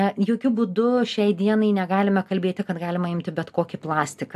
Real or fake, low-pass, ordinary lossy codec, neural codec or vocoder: real; 14.4 kHz; AAC, 96 kbps; none